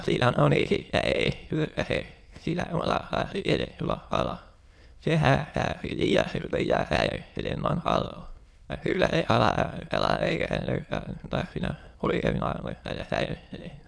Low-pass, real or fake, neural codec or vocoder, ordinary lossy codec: none; fake; autoencoder, 22.05 kHz, a latent of 192 numbers a frame, VITS, trained on many speakers; none